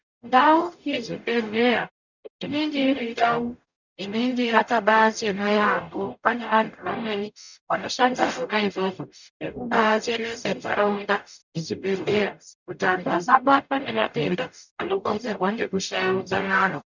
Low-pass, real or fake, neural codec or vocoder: 7.2 kHz; fake; codec, 44.1 kHz, 0.9 kbps, DAC